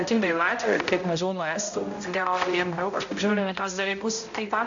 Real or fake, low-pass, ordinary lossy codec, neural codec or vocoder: fake; 7.2 kHz; AAC, 64 kbps; codec, 16 kHz, 0.5 kbps, X-Codec, HuBERT features, trained on balanced general audio